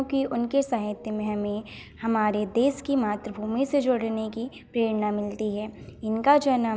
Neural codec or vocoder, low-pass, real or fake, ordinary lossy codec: none; none; real; none